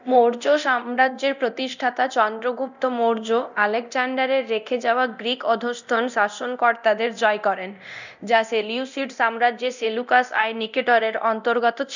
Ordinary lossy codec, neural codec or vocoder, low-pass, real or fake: none; codec, 24 kHz, 0.9 kbps, DualCodec; 7.2 kHz; fake